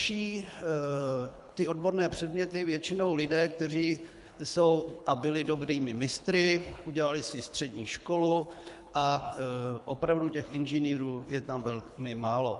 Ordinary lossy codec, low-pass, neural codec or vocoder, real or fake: AAC, 96 kbps; 10.8 kHz; codec, 24 kHz, 3 kbps, HILCodec; fake